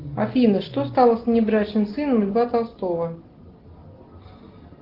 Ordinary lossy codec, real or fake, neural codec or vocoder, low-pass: Opus, 16 kbps; real; none; 5.4 kHz